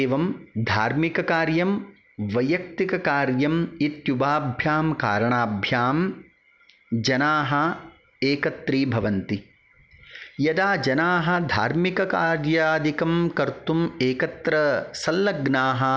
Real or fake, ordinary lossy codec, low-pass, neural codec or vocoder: real; none; none; none